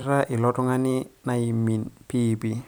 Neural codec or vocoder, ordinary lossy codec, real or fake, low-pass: vocoder, 44.1 kHz, 128 mel bands every 256 samples, BigVGAN v2; none; fake; none